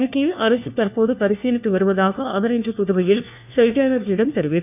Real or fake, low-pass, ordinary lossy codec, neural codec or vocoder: fake; 3.6 kHz; none; codec, 16 kHz, 1 kbps, FunCodec, trained on LibriTTS, 50 frames a second